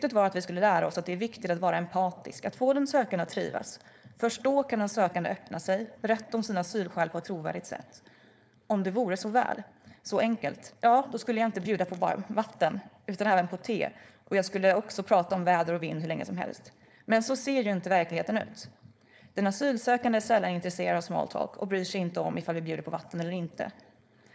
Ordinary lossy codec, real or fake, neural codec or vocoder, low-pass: none; fake; codec, 16 kHz, 4.8 kbps, FACodec; none